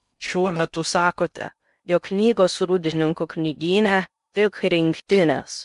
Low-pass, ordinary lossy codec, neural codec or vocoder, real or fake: 10.8 kHz; MP3, 64 kbps; codec, 16 kHz in and 24 kHz out, 0.6 kbps, FocalCodec, streaming, 2048 codes; fake